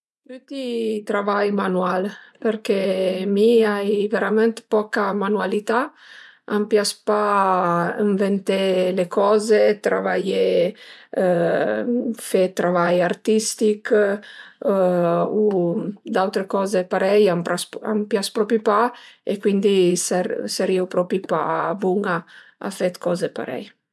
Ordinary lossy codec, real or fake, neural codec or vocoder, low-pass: none; fake; vocoder, 24 kHz, 100 mel bands, Vocos; none